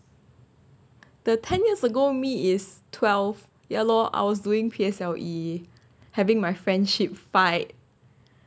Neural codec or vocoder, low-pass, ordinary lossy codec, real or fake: none; none; none; real